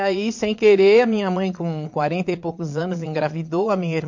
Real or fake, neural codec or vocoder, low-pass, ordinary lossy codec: fake; vocoder, 22.05 kHz, 80 mel bands, Vocos; 7.2 kHz; MP3, 48 kbps